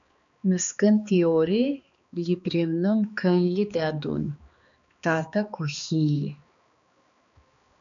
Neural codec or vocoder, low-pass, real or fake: codec, 16 kHz, 2 kbps, X-Codec, HuBERT features, trained on balanced general audio; 7.2 kHz; fake